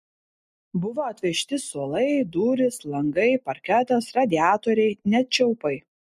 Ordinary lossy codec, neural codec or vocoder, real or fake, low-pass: MP3, 64 kbps; none; real; 14.4 kHz